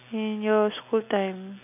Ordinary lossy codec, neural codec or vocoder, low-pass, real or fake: none; none; 3.6 kHz; real